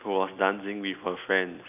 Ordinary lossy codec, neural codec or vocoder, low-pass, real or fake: none; none; 3.6 kHz; real